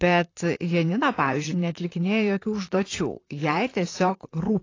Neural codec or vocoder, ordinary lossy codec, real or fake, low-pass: vocoder, 44.1 kHz, 128 mel bands, Pupu-Vocoder; AAC, 32 kbps; fake; 7.2 kHz